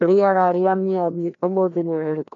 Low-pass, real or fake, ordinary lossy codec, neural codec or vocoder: 7.2 kHz; fake; MP3, 64 kbps; codec, 16 kHz, 1 kbps, FreqCodec, larger model